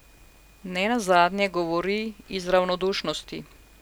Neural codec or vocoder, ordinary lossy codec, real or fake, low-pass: none; none; real; none